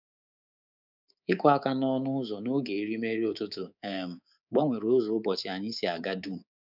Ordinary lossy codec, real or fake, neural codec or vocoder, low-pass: none; fake; codec, 24 kHz, 3.1 kbps, DualCodec; 5.4 kHz